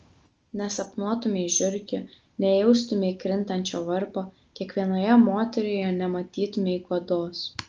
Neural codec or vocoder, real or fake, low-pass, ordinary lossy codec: none; real; 7.2 kHz; Opus, 24 kbps